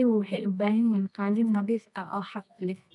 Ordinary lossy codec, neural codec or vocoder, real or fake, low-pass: none; codec, 24 kHz, 0.9 kbps, WavTokenizer, medium music audio release; fake; 10.8 kHz